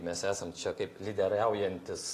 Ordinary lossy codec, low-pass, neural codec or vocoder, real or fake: AAC, 48 kbps; 14.4 kHz; none; real